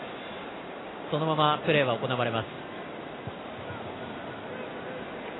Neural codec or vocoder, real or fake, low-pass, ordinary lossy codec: none; real; 7.2 kHz; AAC, 16 kbps